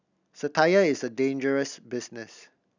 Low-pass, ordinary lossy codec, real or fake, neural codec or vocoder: 7.2 kHz; none; real; none